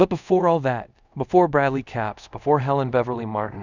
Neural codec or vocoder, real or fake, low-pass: codec, 24 kHz, 0.5 kbps, DualCodec; fake; 7.2 kHz